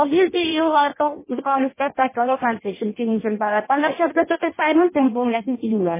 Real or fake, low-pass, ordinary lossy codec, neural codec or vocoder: fake; 3.6 kHz; MP3, 16 kbps; codec, 16 kHz in and 24 kHz out, 0.6 kbps, FireRedTTS-2 codec